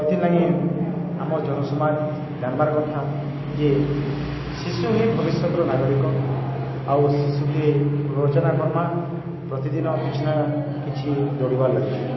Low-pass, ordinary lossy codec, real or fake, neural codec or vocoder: 7.2 kHz; MP3, 24 kbps; real; none